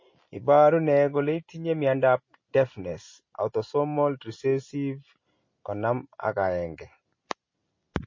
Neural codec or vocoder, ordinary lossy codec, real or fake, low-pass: none; MP3, 32 kbps; real; 7.2 kHz